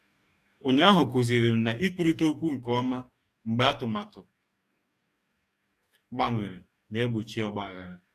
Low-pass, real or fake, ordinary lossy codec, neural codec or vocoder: 14.4 kHz; fake; none; codec, 44.1 kHz, 2.6 kbps, DAC